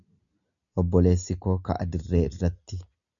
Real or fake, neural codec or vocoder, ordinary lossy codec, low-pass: real; none; MP3, 96 kbps; 7.2 kHz